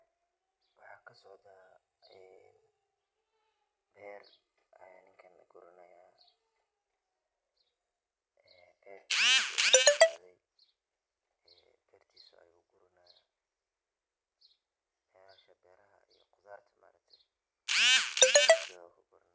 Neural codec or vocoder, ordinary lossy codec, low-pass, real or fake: none; none; none; real